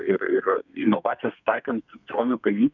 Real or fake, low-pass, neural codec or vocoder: fake; 7.2 kHz; codec, 32 kHz, 1.9 kbps, SNAC